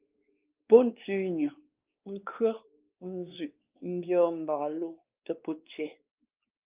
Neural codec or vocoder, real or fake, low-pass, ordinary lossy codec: codec, 16 kHz, 2 kbps, X-Codec, WavLM features, trained on Multilingual LibriSpeech; fake; 3.6 kHz; Opus, 24 kbps